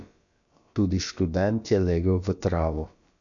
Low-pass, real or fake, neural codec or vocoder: 7.2 kHz; fake; codec, 16 kHz, about 1 kbps, DyCAST, with the encoder's durations